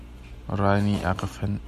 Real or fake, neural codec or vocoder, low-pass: real; none; 14.4 kHz